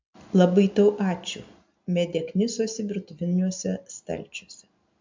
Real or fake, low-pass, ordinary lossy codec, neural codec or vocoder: real; 7.2 kHz; MP3, 64 kbps; none